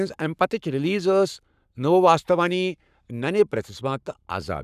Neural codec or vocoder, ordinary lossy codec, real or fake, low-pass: codec, 44.1 kHz, 7.8 kbps, Pupu-Codec; none; fake; 14.4 kHz